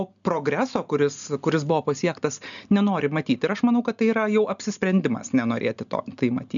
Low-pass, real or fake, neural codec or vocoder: 7.2 kHz; real; none